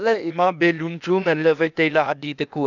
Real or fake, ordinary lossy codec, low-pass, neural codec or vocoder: fake; none; 7.2 kHz; codec, 16 kHz, 0.8 kbps, ZipCodec